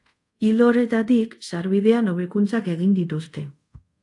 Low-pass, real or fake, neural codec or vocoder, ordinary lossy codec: 10.8 kHz; fake; codec, 24 kHz, 0.5 kbps, DualCodec; AAC, 64 kbps